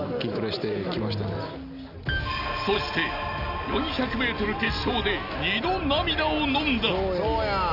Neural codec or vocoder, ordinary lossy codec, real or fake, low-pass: none; none; real; 5.4 kHz